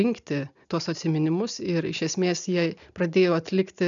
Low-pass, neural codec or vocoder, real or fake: 7.2 kHz; none; real